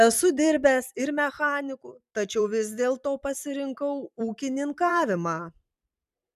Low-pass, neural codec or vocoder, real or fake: 14.4 kHz; vocoder, 44.1 kHz, 128 mel bands every 256 samples, BigVGAN v2; fake